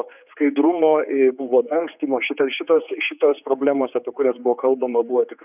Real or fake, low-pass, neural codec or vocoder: fake; 3.6 kHz; codec, 16 kHz, 4 kbps, X-Codec, HuBERT features, trained on general audio